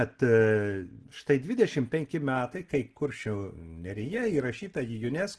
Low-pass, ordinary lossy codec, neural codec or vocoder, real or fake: 10.8 kHz; Opus, 16 kbps; none; real